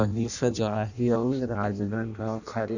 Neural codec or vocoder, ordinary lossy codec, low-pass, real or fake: codec, 16 kHz in and 24 kHz out, 0.6 kbps, FireRedTTS-2 codec; none; 7.2 kHz; fake